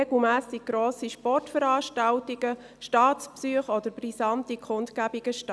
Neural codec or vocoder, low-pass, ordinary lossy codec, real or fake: none; none; none; real